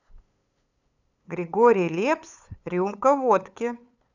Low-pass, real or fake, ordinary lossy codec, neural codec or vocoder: 7.2 kHz; fake; none; codec, 16 kHz, 8 kbps, FunCodec, trained on LibriTTS, 25 frames a second